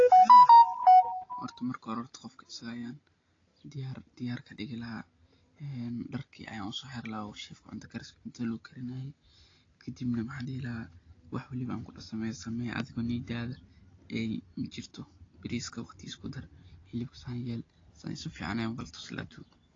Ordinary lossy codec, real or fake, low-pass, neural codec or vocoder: AAC, 32 kbps; real; 7.2 kHz; none